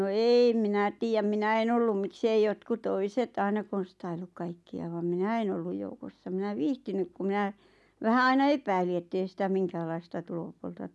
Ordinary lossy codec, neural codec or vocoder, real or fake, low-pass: none; none; real; none